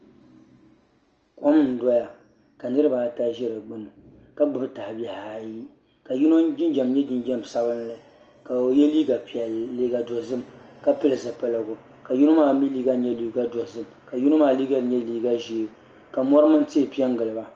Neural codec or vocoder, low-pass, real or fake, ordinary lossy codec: none; 7.2 kHz; real; Opus, 24 kbps